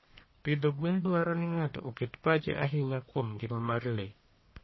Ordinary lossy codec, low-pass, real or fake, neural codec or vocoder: MP3, 24 kbps; 7.2 kHz; fake; codec, 44.1 kHz, 1.7 kbps, Pupu-Codec